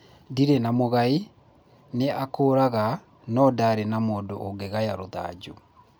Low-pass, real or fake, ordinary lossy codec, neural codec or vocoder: none; real; none; none